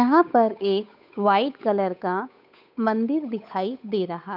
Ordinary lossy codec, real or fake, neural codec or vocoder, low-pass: none; fake; codec, 16 kHz, 8 kbps, FunCodec, trained on Chinese and English, 25 frames a second; 5.4 kHz